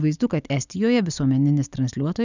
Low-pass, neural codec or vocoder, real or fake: 7.2 kHz; none; real